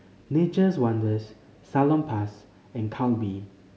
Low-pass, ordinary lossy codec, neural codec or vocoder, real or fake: none; none; none; real